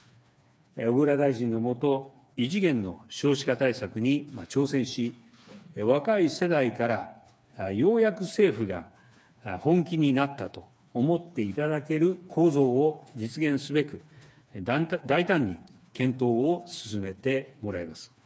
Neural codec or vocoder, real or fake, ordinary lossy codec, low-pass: codec, 16 kHz, 4 kbps, FreqCodec, smaller model; fake; none; none